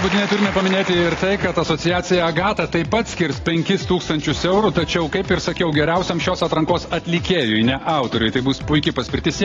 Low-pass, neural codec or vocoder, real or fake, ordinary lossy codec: 7.2 kHz; none; real; AAC, 32 kbps